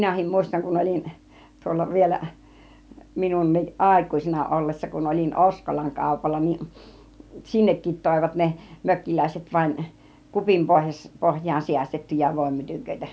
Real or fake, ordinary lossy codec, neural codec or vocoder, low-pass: real; none; none; none